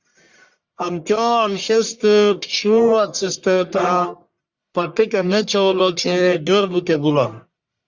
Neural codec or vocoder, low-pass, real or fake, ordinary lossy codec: codec, 44.1 kHz, 1.7 kbps, Pupu-Codec; 7.2 kHz; fake; Opus, 64 kbps